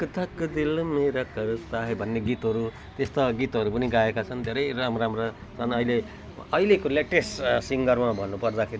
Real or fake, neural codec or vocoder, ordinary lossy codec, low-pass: real; none; none; none